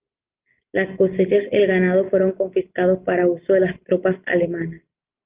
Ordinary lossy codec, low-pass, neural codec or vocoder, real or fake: Opus, 32 kbps; 3.6 kHz; none; real